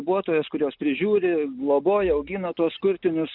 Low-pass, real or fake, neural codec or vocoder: 5.4 kHz; real; none